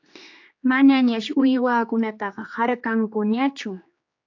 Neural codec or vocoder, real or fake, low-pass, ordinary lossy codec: codec, 16 kHz, 2 kbps, X-Codec, HuBERT features, trained on general audio; fake; 7.2 kHz; AAC, 48 kbps